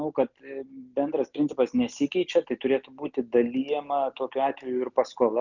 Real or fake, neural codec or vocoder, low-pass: real; none; 7.2 kHz